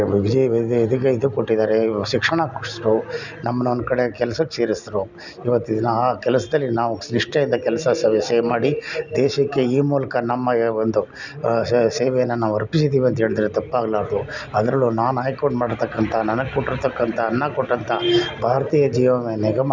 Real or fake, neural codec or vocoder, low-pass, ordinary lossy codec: real; none; 7.2 kHz; none